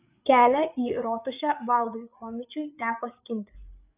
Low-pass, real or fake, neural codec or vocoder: 3.6 kHz; fake; codec, 16 kHz, 8 kbps, FreqCodec, larger model